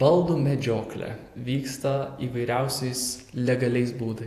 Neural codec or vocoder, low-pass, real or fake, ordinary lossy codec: vocoder, 48 kHz, 128 mel bands, Vocos; 14.4 kHz; fake; AAC, 64 kbps